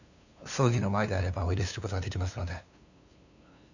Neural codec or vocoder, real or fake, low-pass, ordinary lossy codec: codec, 16 kHz, 2 kbps, FunCodec, trained on LibriTTS, 25 frames a second; fake; 7.2 kHz; none